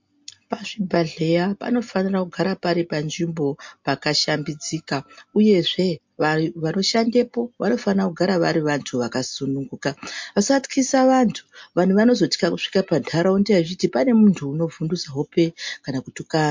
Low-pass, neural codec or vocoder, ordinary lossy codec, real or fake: 7.2 kHz; none; MP3, 48 kbps; real